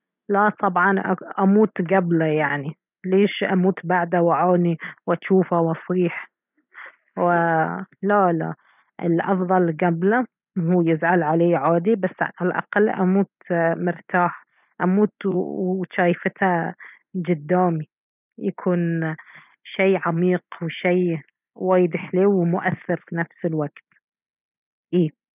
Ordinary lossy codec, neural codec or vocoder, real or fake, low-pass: none; none; real; 3.6 kHz